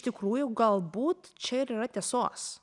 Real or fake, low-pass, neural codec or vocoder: real; 10.8 kHz; none